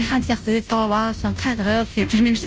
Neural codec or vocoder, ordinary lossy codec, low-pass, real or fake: codec, 16 kHz, 0.5 kbps, FunCodec, trained on Chinese and English, 25 frames a second; none; none; fake